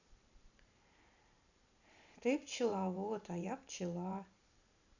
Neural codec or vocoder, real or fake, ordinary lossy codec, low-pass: vocoder, 44.1 kHz, 128 mel bands every 512 samples, BigVGAN v2; fake; none; 7.2 kHz